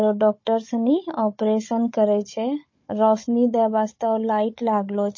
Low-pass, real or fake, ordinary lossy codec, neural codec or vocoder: 7.2 kHz; fake; MP3, 32 kbps; codec, 16 kHz, 16 kbps, FunCodec, trained on Chinese and English, 50 frames a second